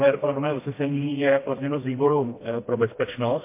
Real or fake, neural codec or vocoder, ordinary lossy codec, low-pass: fake; codec, 16 kHz, 1 kbps, FreqCodec, smaller model; MP3, 24 kbps; 3.6 kHz